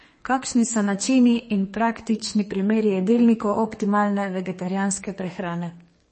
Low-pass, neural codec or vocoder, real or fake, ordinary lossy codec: 10.8 kHz; codec, 32 kHz, 1.9 kbps, SNAC; fake; MP3, 32 kbps